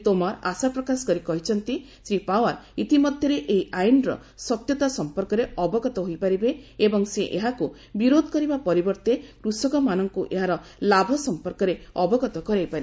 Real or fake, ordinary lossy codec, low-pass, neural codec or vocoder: real; none; none; none